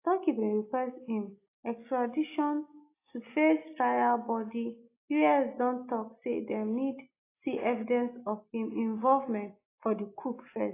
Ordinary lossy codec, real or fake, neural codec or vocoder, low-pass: AAC, 24 kbps; real; none; 3.6 kHz